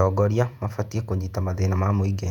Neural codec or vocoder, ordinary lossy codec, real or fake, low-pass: vocoder, 48 kHz, 128 mel bands, Vocos; none; fake; 19.8 kHz